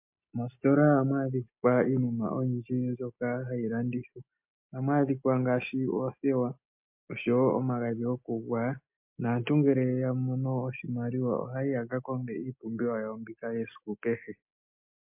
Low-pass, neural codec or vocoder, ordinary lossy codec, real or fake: 3.6 kHz; none; MP3, 32 kbps; real